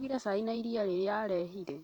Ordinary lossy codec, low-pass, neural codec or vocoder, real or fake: none; 19.8 kHz; vocoder, 44.1 kHz, 128 mel bands every 512 samples, BigVGAN v2; fake